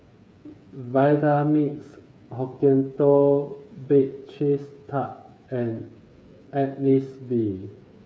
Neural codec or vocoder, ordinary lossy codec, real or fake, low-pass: codec, 16 kHz, 8 kbps, FreqCodec, smaller model; none; fake; none